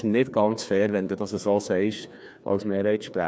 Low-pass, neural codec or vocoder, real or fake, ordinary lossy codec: none; codec, 16 kHz, 2 kbps, FreqCodec, larger model; fake; none